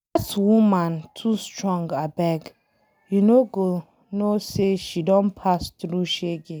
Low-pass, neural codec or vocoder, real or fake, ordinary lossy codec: none; none; real; none